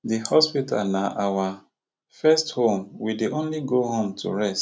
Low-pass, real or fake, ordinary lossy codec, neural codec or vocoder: none; real; none; none